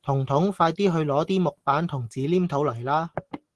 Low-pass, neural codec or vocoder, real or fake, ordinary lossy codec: 10.8 kHz; none; real; Opus, 16 kbps